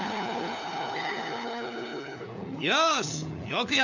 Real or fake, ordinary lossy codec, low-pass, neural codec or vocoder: fake; none; 7.2 kHz; codec, 16 kHz, 4 kbps, FunCodec, trained on LibriTTS, 50 frames a second